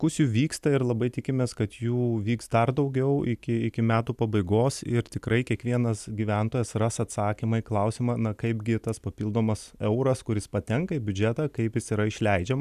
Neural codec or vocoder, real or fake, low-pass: none; real; 14.4 kHz